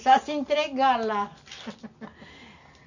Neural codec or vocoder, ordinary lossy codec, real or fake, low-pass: none; none; real; 7.2 kHz